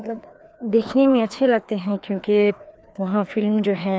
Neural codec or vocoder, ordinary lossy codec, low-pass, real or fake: codec, 16 kHz, 2 kbps, FreqCodec, larger model; none; none; fake